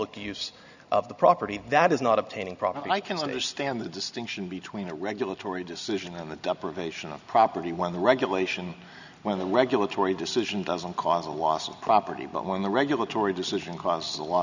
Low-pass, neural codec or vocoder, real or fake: 7.2 kHz; none; real